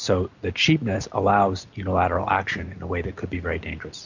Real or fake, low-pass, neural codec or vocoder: real; 7.2 kHz; none